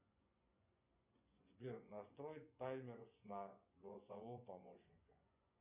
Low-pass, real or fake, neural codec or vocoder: 3.6 kHz; fake; vocoder, 24 kHz, 100 mel bands, Vocos